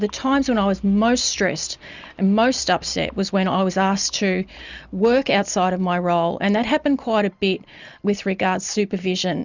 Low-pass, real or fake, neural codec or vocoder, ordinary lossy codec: 7.2 kHz; real; none; Opus, 64 kbps